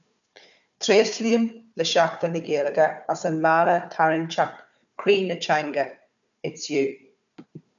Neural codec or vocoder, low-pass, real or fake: codec, 16 kHz, 4 kbps, FunCodec, trained on Chinese and English, 50 frames a second; 7.2 kHz; fake